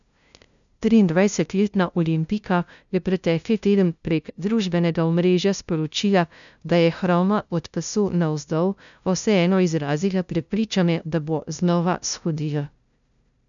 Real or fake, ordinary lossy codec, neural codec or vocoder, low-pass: fake; none; codec, 16 kHz, 0.5 kbps, FunCodec, trained on LibriTTS, 25 frames a second; 7.2 kHz